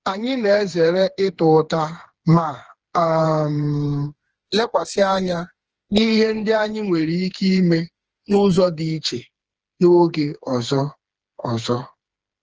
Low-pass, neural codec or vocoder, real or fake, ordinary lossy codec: 7.2 kHz; codec, 16 kHz, 4 kbps, FreqCodec, smaller model; fake; Opus, 16 kbps